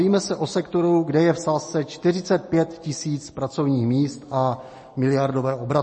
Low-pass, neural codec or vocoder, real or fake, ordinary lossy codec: 9.9 kHz; none; real; MP3, 32 kbps